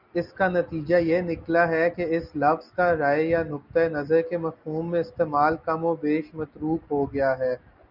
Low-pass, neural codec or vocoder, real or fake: 5.4 kHz; none; real